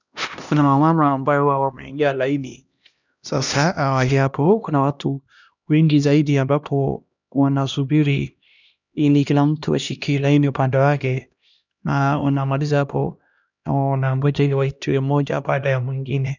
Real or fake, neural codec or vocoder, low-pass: fake; codec, 16 kHz, 1 kbps, X-Codec, HuBERT features, trained on LibriSpeech; 7.2 kHz